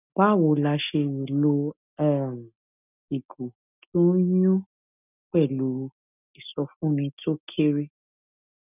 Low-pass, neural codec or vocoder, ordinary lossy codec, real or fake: 3.6 kHz; none; none; real